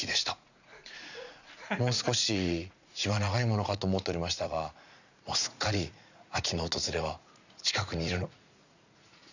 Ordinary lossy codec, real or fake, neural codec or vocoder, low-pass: none; fake; vocoder, 44.1 kHz, 80 mel bands, Vocos; 7.2 kHz